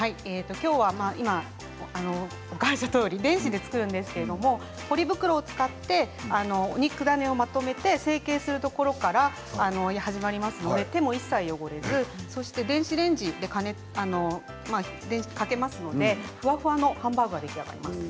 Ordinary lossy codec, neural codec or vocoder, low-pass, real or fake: none; none; none; real